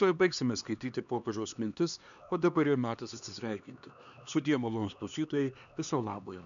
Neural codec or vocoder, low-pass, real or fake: codec, 16 kHz, 2 kbps, X-Codec, HuBERT features, trained on LibriSpeech; 7.2 kHz; fake